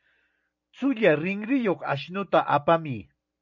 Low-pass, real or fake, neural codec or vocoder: 7.2 kHz; real; none